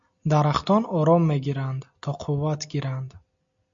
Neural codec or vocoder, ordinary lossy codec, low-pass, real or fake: none; AAC, 64 kbps; 7.2 kHz; real